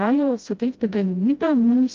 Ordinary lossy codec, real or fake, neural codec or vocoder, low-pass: Opus, 24 kbps; fake; codec, 16 kHz, 0.5 kbps, FreqCodec, smaller model; 7.2 kHz